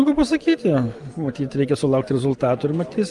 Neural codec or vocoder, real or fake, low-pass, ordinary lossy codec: none; real; 10.8 kHz; Opus, 24 kbps